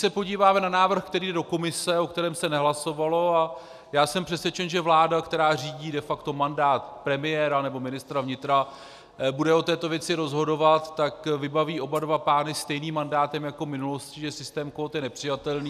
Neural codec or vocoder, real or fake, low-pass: none; real; 14.4 kHz